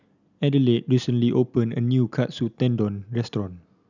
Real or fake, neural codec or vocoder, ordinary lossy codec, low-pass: real; none; none; 7.2 kHz